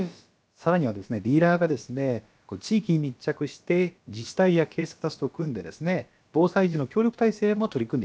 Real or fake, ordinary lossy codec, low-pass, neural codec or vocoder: fake; none; none; codec, 16 kHz, about 1 kbps, DyCAST, with the encoder's durations